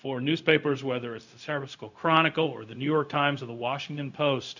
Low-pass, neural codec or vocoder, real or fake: 7.2 kHz; codec, 16 kHz, 0.4 kbps, LongCat-Audio-Codec; fake